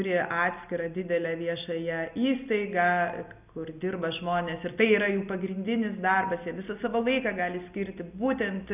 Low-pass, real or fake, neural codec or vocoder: 3.6 kHz; real; none